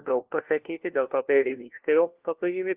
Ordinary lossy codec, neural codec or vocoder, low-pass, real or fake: Opus, 16 kbps; codec, 16 kHz, 1 kbps, FunCodec, trained on LibriTTS, 50 frames a second; 3.6 kHz; fake